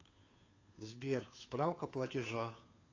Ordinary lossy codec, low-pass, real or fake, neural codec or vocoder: AAC, 32 kbps; 7.2 kHz; fake; codec, 16 kHz, 2 kbps, FunCodec, trained on Chinese and English, 25 frames a second